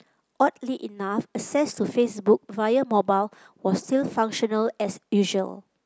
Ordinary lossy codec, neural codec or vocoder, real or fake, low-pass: none; none; real; none